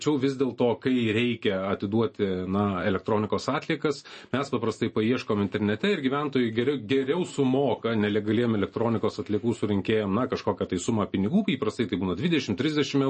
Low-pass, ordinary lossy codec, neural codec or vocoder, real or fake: 10.8 kHz; MP3, 32 kbps; none; real